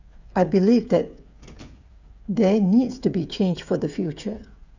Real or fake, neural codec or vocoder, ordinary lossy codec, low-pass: fake; codec, 16 kHz, 8 kbps, FreqCodec, smaller model; none; 7.2 kHz